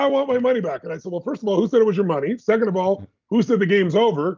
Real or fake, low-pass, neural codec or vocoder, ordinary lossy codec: real; 7.2 kHz; none; Opus, 24 kbps